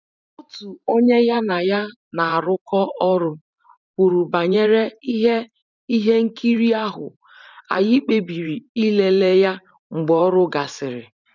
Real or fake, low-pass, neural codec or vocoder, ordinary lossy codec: fake; 7.2 kHz; vocoder, 44.1 kHz, 128 mel bands every 512 samples, BigVGAN v2; none